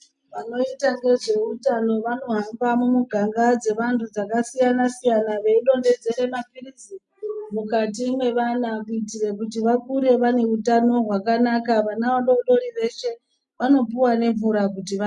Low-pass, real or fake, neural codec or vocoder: 10.8 kHz; real; none